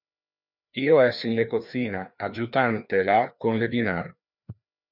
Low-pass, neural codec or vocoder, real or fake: 5.4 kHz; codec, 16 kHz, 2 kbps, FreqCodec, larger model; fake